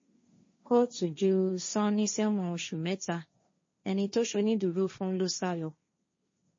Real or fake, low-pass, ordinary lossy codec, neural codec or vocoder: fake; 7.2 kHz; MP3, 32 kbps; codec, 16 kHz, 1.1 kbps, Voila-Tokenizer